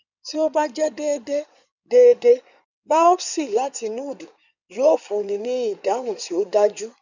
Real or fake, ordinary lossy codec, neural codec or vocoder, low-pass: fake; none; codec, 16 kHz in and 24 kHz out, 2.2 kbps, FireRedTTS-2 codec; 7.2 kHz